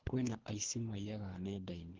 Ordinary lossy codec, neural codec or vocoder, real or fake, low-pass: Opus, 16 kbps; codec, 24 kHz, 3 kbps, HILCodec; fake; 7.2 kHz